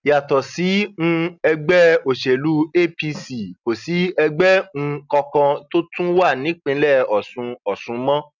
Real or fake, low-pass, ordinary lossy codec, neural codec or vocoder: real; 7.2 kHz; none; none